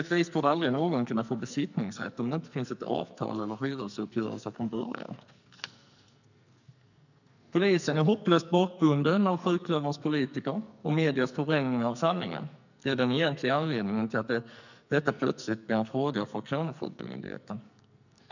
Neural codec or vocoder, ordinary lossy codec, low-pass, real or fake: codec, 44.1 kHz, 2.6 kbps, SNAC; none; 7.2 kHz; fake